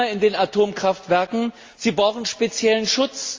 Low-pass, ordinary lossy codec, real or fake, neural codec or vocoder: 7.2 kHz; Opus, 32 kbps; real; none